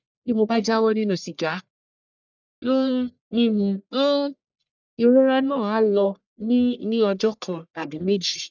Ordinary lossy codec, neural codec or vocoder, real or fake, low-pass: none; codec, 44.1 kHz, 1.7 kbps, Pupu-Codec; fake; 7.2 kHz